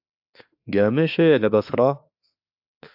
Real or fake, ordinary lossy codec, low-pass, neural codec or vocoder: fake; AAC, 48 kbps; 5.4 kHz; autoencoder, 48 kHz, 32 numbers a frame, DAC-VAE, trained on Japanese speech